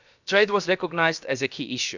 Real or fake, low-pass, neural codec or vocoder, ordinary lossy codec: fake; 7.2 kHz; codec, 16 kHz, about 1 kbps, DyCAST, with the encoder's durations; none